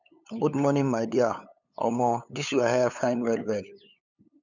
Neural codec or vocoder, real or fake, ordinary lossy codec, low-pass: codec, 16 kHz, 8 kbps, FunCodec, trained on LibriTTS, 25 frames a second; fake; none; 7.2 kHz